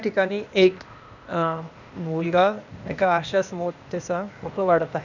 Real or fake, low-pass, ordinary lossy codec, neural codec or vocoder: fake; 7.2 kHz; none; codec, 16 kHz, 0.8 kbps, ZipCodec